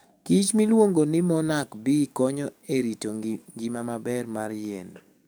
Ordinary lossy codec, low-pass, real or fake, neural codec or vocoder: none; none; fake; codec, 44.1 kHz, 7.8 kbps, DAC